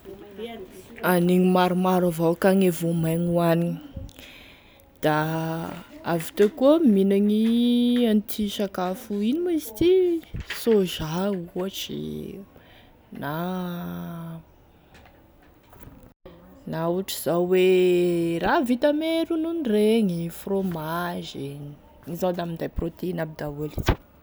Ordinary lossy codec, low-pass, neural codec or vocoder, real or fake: none; none; none; real